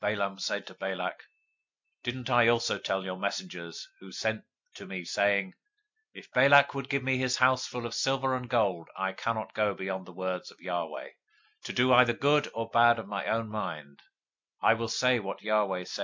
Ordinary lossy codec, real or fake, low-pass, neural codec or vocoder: MP3, 64 kbps; real; 7.2 kHz; none